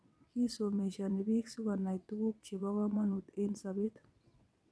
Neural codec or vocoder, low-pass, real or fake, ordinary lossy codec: vocoder, 22.05 kHz, 80 mel bands, WaveNeXt; none; fake; none